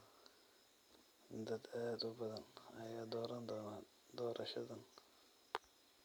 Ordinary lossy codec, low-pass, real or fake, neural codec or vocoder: none; none; real; none